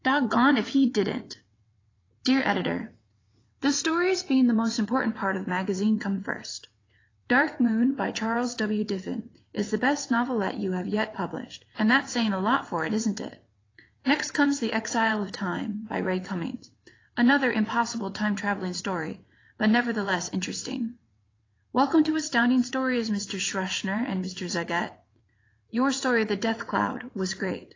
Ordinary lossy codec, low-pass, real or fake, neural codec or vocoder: AAC, 32 kbps; 7.2 kHz; fake; vocoder, 22.05 kHz, 80 mel bands, WaveNeXt